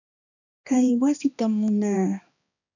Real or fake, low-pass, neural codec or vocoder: fake; 7.2 kHz; codec, 16 kHz, 2 kbps, X-Codec, HuBERT features, trained on balanced general audio